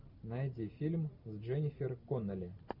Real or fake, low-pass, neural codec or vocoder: real; 5.4 kHz; none